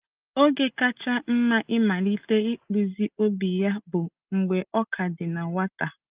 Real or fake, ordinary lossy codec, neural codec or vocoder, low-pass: real; Opus, 24 kbps; none; 3.6 kHz